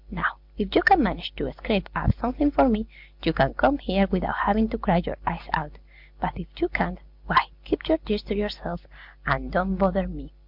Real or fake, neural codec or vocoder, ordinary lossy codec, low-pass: real; none; MP3, 48 kbps; 5.4 kHz